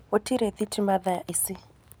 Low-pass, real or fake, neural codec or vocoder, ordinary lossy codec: none; real; none; none